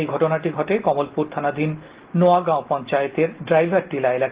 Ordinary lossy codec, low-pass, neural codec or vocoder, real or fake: Opus, 16 kbps; 3.6 kHz; none; real